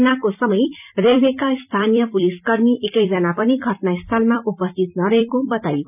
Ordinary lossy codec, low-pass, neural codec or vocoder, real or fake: none; 3.6 kHz; vocoder, 44.1 kHz, 128 mel bands every 256 samples, BigVGAN v2; fake